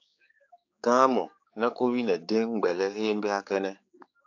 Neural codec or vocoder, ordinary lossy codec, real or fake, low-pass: codec, 16 kHz, 4 kbps, X-Codec, HuBERT features, trained on general audio; AAC, 48 kbps; fake; 7.2 kHz